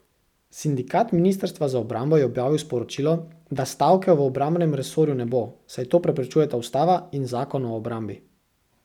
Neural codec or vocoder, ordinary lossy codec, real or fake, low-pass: none; none; real; 19.8 kHz